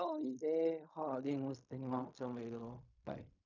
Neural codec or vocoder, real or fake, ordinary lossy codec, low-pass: codec, 16 kHz in and 24 kHz out, 0.4 kbps, LongCat-Audio-Codec, fine tuned four codebook decoder; fake; none; 7.2 kHz